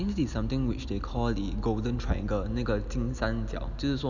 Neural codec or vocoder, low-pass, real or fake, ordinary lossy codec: none; 7.2 kHz; real; none